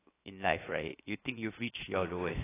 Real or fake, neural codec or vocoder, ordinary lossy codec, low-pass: fake; codec, 16 kHz, 0.7 kbps, FocalCodec; AAC, 16 kbps; 3.6 kHz